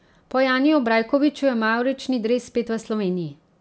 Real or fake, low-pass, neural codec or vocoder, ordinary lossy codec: real; none; none; none